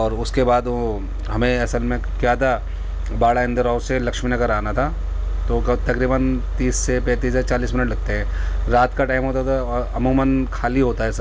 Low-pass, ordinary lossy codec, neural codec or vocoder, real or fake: none; none; none; real